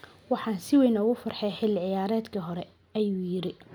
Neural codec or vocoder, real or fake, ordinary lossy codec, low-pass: none; real; none; 19.8 kHz